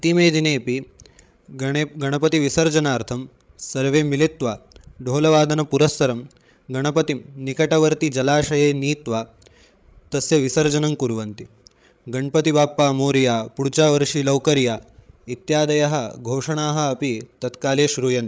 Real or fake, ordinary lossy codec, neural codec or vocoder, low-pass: fake; none; codec, 16 kHz, 16 kbps, FreqCodec, larger model; none